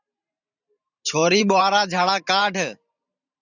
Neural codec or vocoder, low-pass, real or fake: vocoder, 44.1 kHz, 128 mel bands every 512 samples, BigVGAN v2; 7.2 kHz; fake